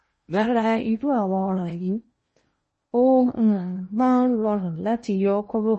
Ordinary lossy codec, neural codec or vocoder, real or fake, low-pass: MP3, 32 kbps; codec, 16 kHz in and 24 kHz out, 0.8 kbps, FocalCodec, streaming, 65536 codes; fake; 10.8 kHz